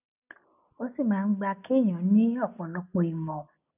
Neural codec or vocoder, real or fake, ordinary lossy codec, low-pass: none; real; none; 3.6 kHz